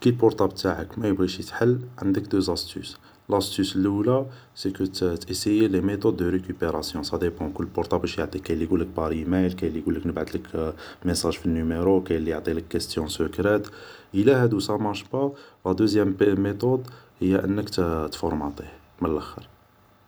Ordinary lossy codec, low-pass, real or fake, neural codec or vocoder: none; none; real; none